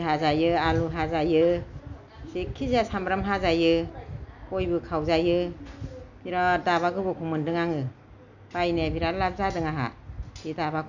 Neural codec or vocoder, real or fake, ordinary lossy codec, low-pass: none; real; none; 7.2 kHz